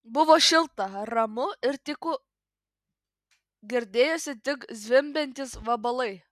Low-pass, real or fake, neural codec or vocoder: 14.4 kHz; real; none